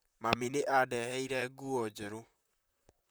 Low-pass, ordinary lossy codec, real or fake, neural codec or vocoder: none; none; fake; vocoder, 44.1 kHz, 128 mel bands, Pupu-Vocoder